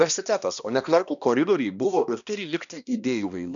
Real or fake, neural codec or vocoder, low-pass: fake; codec, 16 kHz, 1 kbps, X-Codec, HuBERT features, trained on balanced general audio; 7.2 kHz